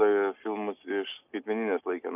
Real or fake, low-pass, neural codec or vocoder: real; 3.6 kHz; none